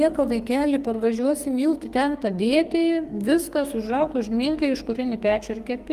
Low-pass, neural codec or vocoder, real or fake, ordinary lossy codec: 14.4 kHz; codec, 44.1 kHz, 2.6 kbps, SNAC; fake; Opus, 24 kbps